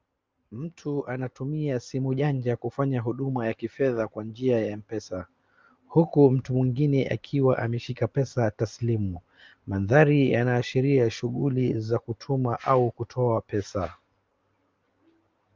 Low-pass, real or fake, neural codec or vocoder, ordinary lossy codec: 7.2 kHz; real; none; Opus, 24 kbps